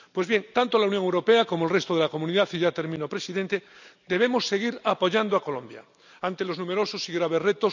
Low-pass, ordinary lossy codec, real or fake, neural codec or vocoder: 7.2 kHz; none; real; none